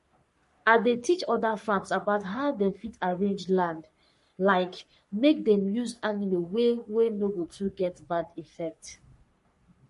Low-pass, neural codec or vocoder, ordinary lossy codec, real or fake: 14.4 kHz; codec, 44.1 kHz, 3.4 kbps, Pupu-Codec; MP3, 48 kbps; fake